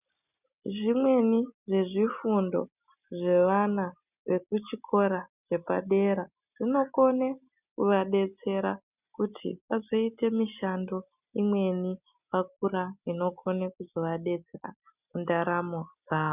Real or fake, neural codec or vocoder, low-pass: real; none; 3.6 kHz